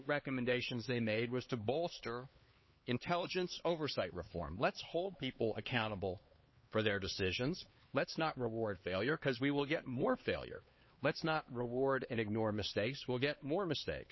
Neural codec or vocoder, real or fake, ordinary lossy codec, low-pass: codec, 16 kHz, 4 kbps, X-Codec, HuBERT features, trained on LibriSpeech; fake; MP3, 24 kbps; 7.2 kHz